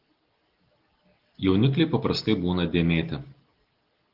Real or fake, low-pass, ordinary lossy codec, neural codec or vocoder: real; 5.4 kHz; Opus, 16 kbps; none